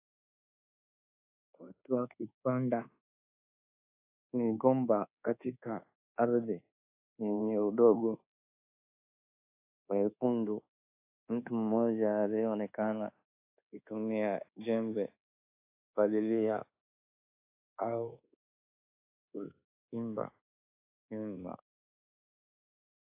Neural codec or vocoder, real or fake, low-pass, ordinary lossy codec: codec, 24 kHz, 1.2 kbps, DualCodec; fake; 3.6 kHz; AAC, 24 kbps